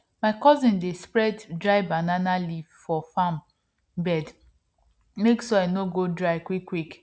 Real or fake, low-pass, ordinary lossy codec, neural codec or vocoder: real; none; none; none